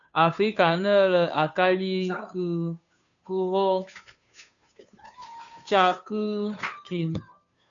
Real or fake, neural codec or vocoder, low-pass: fake; codec, 16 kHz, 2 kbps, FunCodec, trained on Chinese and English, 25 frames a second; 7.2 kHz